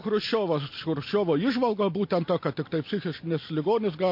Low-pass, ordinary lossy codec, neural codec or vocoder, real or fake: 5.4 kHz; MP3, 32 kbps; none; real